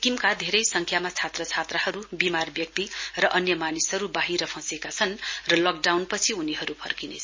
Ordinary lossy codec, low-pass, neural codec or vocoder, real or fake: MP3, 32 kbps; 7.2 kHz; none; real